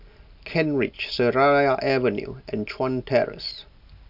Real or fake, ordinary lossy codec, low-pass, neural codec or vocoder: real; none; 5.4 kHz; none